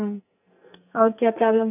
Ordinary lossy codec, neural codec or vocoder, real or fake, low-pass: none; codec, 44.1 kHz, 2.6 kbps, SNAC; fake; 3.6 kHz